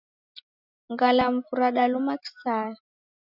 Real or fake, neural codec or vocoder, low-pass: real; none; 5.4 kHz